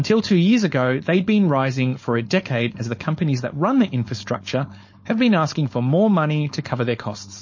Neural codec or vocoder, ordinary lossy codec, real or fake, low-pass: codec, 16 kHz, 4.8 kbps, FACodec; MP3, 32 kbps; fake; 7.2 kHz